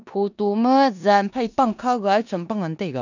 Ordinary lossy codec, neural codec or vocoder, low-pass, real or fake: none; codec, 16 kHz in and 24 kHz out, 0.9 kbps, LongCat-Audio-Codec, four codebook decoder; 7.2 kHz; fake